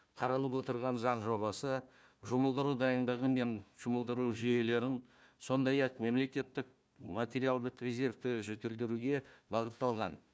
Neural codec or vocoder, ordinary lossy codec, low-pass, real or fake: codec, 16 kHz, 1 kbps, FunCodec, trained on Chinese and English, 50 frames a second; none; none; fake